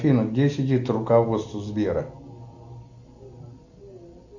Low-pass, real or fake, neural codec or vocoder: 7.2 kHz; real; none